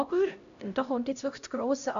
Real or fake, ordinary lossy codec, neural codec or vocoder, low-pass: fake; AAC, 96 kbps; codec, 16 kHz, 0.5 kbps, X-Codec, HuBERT features, trained on LibriSpeech; 7.2 kHz